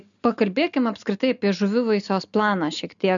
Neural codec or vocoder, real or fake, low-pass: none; real; 7.2 kHz